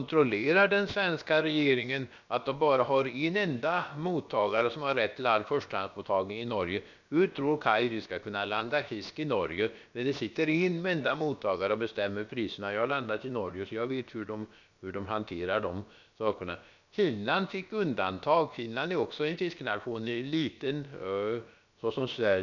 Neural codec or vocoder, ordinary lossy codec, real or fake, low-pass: codec, 16 kHz, about 1 kbps, DyCAST, with the encoder's durations; none; fake; 7.2 kHz